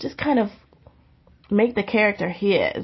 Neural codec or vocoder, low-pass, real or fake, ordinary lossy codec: none; 7.2 kHz; real; MP3, 24 kbps